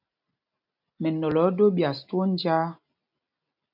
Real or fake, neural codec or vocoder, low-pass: real; none; 5.4 kHz